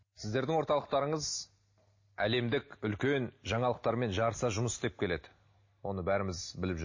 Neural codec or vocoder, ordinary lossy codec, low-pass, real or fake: none; MP3, 32 kbps; 7.2 kHz; real